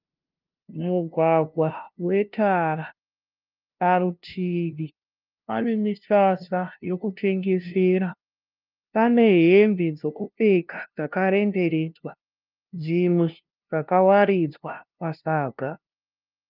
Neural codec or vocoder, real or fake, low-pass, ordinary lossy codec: codec, 16 kHz, 0.5 kbps, FunCodec, trained on LibriTTS, 25 frames a second; fake; 5.4 kHz; Opus, 24 kbps